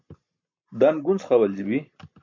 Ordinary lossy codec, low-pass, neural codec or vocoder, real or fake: MP3, 48 kbps; 7.2 kHz; none; real